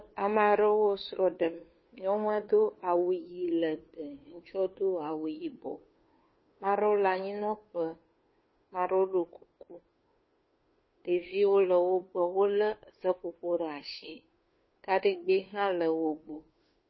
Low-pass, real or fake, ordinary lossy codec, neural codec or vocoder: 7.2 kHz; fake; MP3, 24 kbps; codec, 16 kHz, 2 kbps, FunCodec, trained on Chinese and English, 25 frames a second